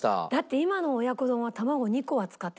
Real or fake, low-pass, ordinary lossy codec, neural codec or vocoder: real; none; none; none